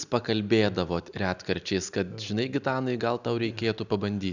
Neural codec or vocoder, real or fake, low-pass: none; real; 7.2 kHz